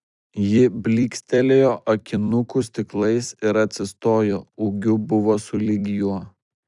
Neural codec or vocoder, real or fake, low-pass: vocoder, 44.1 kHz, 128 mel bands every 256 samples, BigVGAN v2; fake; 10.8 kHz